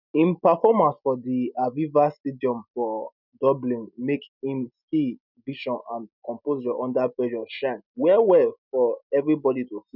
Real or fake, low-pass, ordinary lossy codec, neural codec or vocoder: real; 5.4 kHz; none; none